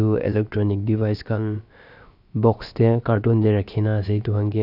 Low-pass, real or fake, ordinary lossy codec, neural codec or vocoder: 5.4 kHz; fake; none; codec, 16 kHz, about 1 kbps, DyCAST, with the encoder's durations